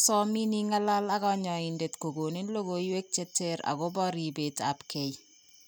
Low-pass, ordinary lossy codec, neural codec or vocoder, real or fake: none; none; none; real